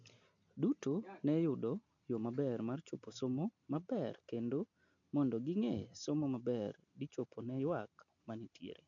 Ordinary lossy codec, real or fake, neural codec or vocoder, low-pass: MP3, 96 kbps; real; none; 7.2 kHz